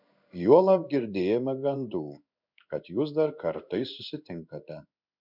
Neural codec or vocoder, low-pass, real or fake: codec, 16 kHz in and 24 kHz out, 1 kbps, XY-Tokenizer; 5.4 kHz; fake